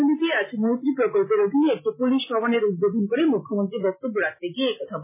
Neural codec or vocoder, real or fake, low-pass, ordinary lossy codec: none; real; 3.6 kHz; MP3, 16 kbps